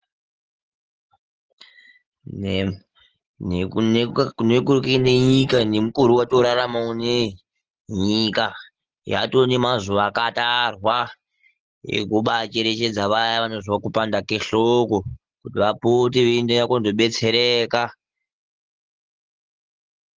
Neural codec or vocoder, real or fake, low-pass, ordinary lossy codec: none; real; 7.2 kHz; Opus, 16 kbps